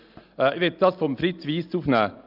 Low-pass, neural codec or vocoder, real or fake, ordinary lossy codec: 5.4 kHz; none; real; Opus, 24 kbps